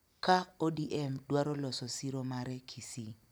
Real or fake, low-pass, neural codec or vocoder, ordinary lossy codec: real; none; none; none